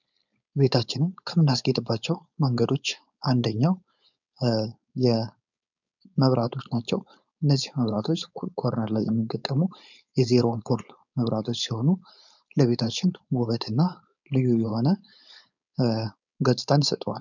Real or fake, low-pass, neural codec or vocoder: fake; 7.2 kHz; codec, 16 kHz, 4.8 kbps, FACodec